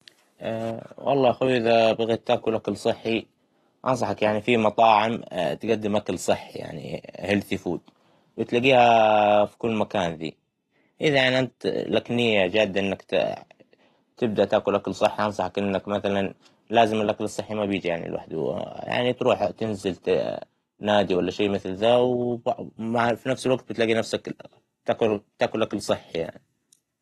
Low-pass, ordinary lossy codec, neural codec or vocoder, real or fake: 19.8 kHz; AAC, 32 kbps; none; real